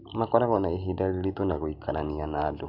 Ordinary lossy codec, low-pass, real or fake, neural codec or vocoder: none; 5.4 kHz; real; none